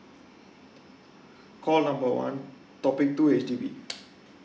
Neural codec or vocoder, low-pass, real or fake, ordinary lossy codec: none; none; real; none